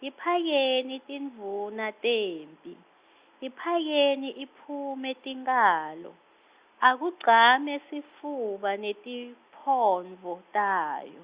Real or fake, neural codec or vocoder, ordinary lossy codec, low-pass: real; none; Opus, 64 kbps; 3.6 kHz